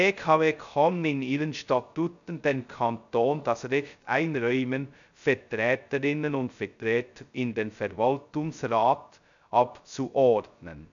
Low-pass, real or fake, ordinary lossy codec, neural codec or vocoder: 7.2 kHz; fake; none; codec, 16 kHz, 0.2 kbps, FocalCodec